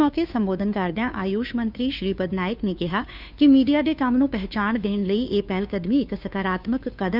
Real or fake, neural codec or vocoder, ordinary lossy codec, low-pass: fake; codec, 16 kHz, 2 kbps, FunCodec, trained on Chinese and English, 25 frames a second; none; 5.4 kHz